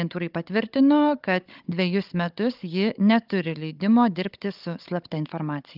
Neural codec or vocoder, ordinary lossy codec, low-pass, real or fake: codec, 16 kHz, 16 kbps, FunCodec, trained on Chinese and English, 50 frames a second; Opus, 24 kbps; 5.4 kHz; fake